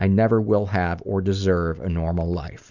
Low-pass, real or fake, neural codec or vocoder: 7.2 kHz; real; none